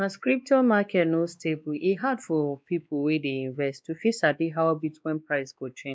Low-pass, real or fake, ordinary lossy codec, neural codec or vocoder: none; fake; none; codec, 16 kHz, 2 kbps, X-Codec, WavLM features, trained on Multilingual LibriSpeech